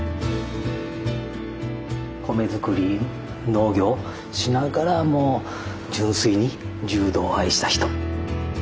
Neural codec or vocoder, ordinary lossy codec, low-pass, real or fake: none; none; none; real